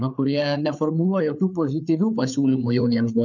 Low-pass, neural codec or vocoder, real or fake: 7.2 kHz; codec, 16 kHz, 4 kbps, FreqCodec, larger model; fake